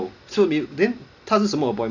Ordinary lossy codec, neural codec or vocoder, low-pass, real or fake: none; none; 7.2 kHz; real